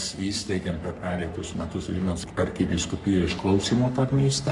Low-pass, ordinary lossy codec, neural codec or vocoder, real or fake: 10.8 kHz; MP3, 64 kbps; codec, 44.1 kHz, 3.4 kbps, Pupu-Codec; fake